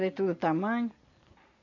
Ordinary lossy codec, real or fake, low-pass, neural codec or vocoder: none; real; 7.2 kHz; none